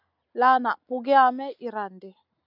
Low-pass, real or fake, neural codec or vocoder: 5.4 kHz; real; none